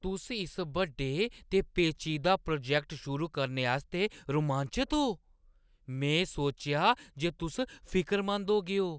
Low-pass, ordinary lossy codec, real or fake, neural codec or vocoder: none; none; real; none